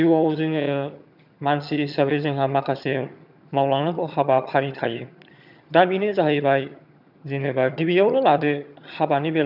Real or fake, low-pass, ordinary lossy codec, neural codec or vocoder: fake; 5.4 kHz; none; vocoder, 22.05 kHz, 80 mel bands, HiFi-GAN